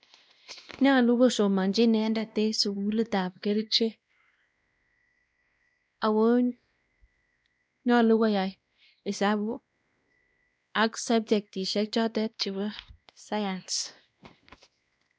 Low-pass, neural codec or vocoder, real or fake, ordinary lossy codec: none; codec, 16 kHz, 1 kbps, X-Codec, WavLM features, trained on Multilingual LibriSpeech; fake; none